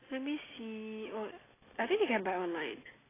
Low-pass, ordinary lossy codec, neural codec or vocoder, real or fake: 3.6 kHz; AAC, 16 kbps; none; real